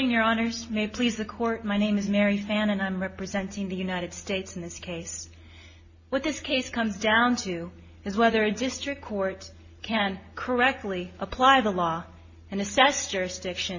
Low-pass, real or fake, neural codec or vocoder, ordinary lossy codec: 7.2 kHz; real; none; MP3, 32 kbps